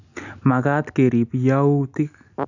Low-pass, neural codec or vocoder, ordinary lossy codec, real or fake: 7.2 kHz; none; none; real